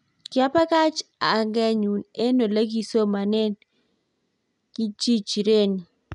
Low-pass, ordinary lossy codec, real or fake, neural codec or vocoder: 10.8 kHz; none; real; none